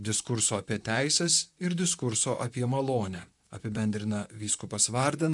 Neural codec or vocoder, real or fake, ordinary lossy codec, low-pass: vocoder, 44.1 kHz, 128 mel bands, Pupu-Vocoder; fake; MP3, 96 kbps; 10.8 kHz